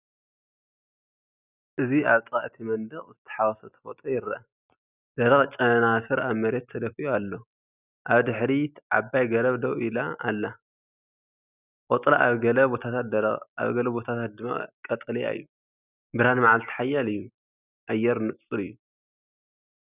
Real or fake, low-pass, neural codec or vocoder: real; 3.6 kHz; none